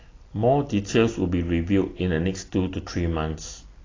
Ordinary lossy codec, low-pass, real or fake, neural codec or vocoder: AAC, 32 kbps; 7.2 kHz; real; none